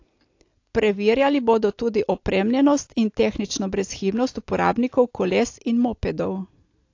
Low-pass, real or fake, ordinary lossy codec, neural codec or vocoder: 7.2 kHz; real; AAC, 48 kbps; none